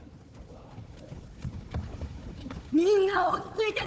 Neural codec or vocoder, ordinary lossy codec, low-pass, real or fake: codec, 16 kHz, 4 kbps, FunCodec, trained on Chinese and English, 50 frames a second; none; none; fake